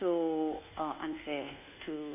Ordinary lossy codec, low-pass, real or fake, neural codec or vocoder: AAC, 32 kbps; 3.6 kHz; fake; codec, 24 kHz, 1.2 kbps, DualCodec